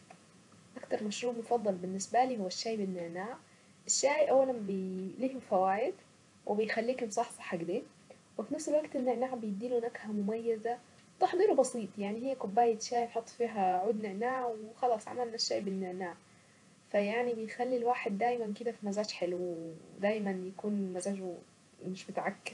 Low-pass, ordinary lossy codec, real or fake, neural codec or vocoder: 10.8 kHz; none; fake; vocoder, 48 kHz, 128 mel bands, Vocos